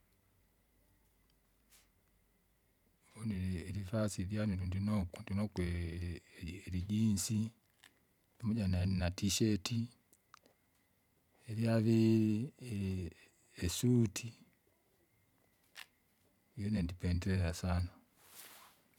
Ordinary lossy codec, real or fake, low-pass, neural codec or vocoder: none; fake; 19.8 kHz; vocoder, 44.1 kHz, 128 mel bands every 512 samples, BigVGAN v2